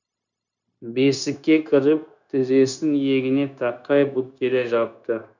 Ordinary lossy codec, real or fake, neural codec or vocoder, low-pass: none; fake; codec, 16 kHz, 0.9 kbps, LongCat-Audio-Codec; 7.2 kHz